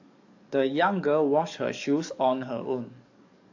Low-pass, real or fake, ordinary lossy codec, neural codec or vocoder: 7.2 kHz; fake; AAC, 48 kbps; codec, 44.1 kHz, 7.8 kbps, DAC